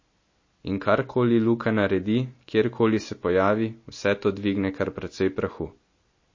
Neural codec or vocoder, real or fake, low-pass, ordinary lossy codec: none; real; 7.2 kHz; MP3, 32 kbps